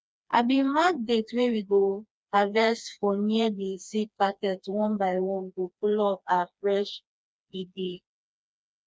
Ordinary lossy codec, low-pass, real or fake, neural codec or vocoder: none; none; fake; codec, 16 kHz, 2 kbps, FreqCodec, smaller model